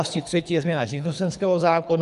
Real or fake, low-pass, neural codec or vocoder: fake; 10.8 kHz; codec, 24 kHz, 3 kbps, HILCodec